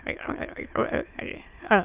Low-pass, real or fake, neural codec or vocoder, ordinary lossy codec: 3.6 kHz; fake; autoencoder, 22.05 kHz, a latent of 192 numbers a frame, VITS, trained on many speakers; Opus, 24 kbps